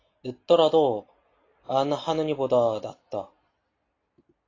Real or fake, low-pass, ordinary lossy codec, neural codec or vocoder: real; 7.2 kHz; AAC, 32 kbps; none